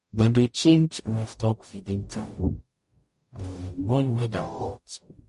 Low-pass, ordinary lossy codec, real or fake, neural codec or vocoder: 14.4 kHz; MP3, 48 kbps; fake; codec, 44.1 kHz, 0.9 kbps, DAC